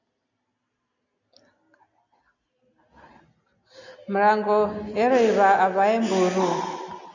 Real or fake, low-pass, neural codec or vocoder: real; 7.2 kHz; none